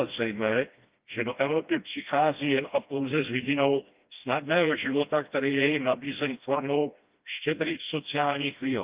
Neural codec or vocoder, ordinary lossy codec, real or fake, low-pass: codec, 16 kHz, 1 kbps, FreqCodec, smaller model; Opus, 24 kbps; fake; 3.6 kHz